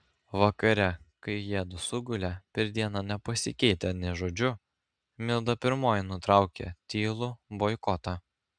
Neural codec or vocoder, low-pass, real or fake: none; 9.9 kHz; real